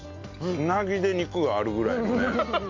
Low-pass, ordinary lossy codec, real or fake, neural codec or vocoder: 7.2 kHz; AAC, 48 kbps; real; none